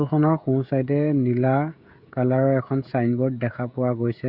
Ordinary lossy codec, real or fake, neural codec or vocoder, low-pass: Opus, 64 kbps; fake; codec, 16 kHz, 16 kbps, FreqCodec, smaller model; 5.4 kHz